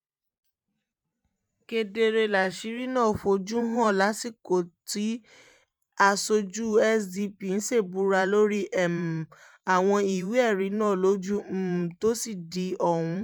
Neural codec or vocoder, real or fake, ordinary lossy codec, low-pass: vocoder, 44.1 kHz, 128 mel bands every 512 samples, BigVGAN v2; fake; none; 19.8 kHz